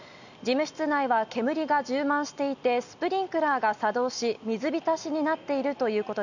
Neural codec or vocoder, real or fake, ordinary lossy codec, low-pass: none; real; none; 7.2 kHz